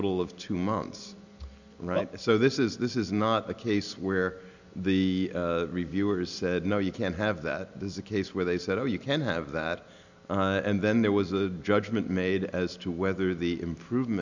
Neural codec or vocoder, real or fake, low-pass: none; real; 7.2 kHz